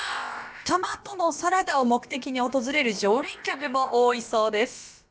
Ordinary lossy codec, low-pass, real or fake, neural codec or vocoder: none; none; fake; codec, 16 kHz, about 1 kbps, DyCAST, with the encoder's durations